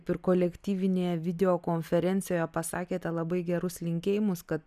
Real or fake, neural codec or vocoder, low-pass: real; none; 14.4 kHz